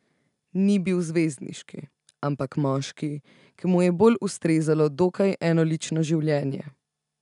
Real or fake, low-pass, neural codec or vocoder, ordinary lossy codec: real; 10.8 kHz; none; none